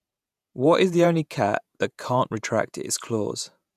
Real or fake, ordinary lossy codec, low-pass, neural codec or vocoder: fake; none; 14.4 kHz; vocoder, 48 kHz, 128 mel bands, Vocos